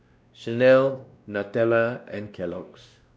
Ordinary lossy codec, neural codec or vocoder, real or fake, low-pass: none; codec, 16 kHz, 1 kbps, X-Codec, WavLM features, trained on Multilingual LibriSpeech; fake; none